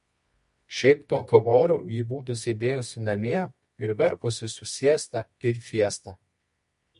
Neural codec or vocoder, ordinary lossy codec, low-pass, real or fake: codec, 24 kHz, 0.9 kbps, WavTokenizer, medium music audio release; MP3, 48 kbps; 10.8 kHz; fake